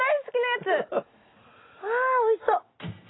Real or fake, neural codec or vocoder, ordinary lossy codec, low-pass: real; none; AAC, 16 kbps; 7.2 kHz